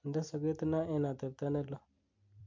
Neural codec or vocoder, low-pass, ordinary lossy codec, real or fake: vocoder, 44.1 kHz, 128 mel bands every 256 samples, BigVGAN v2; 7.2 kHz; MP3, 48 kbps; fake